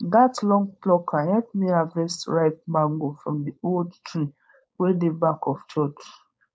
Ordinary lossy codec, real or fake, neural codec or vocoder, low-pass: none; fake; codec, 16 kHz, 4.8 kbps, FACodec; none